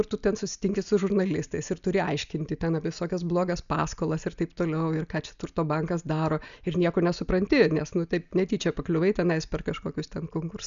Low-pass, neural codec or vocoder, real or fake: 7.2 kHz; none; real